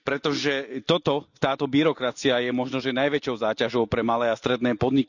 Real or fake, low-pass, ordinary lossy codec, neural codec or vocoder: real; 7.2 kHz; none; none